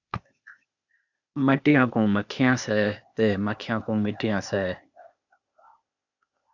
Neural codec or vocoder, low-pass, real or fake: codec, 16 kHz, 0.8 kbps, ZipCodec; 7.2 kHz; fake